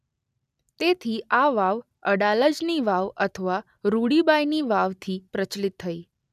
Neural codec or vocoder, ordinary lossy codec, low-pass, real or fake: none; none; 14.4 kHz; real